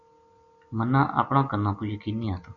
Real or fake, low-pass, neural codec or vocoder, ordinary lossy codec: real; 7.2 kHz; none; MP3, 48 kbps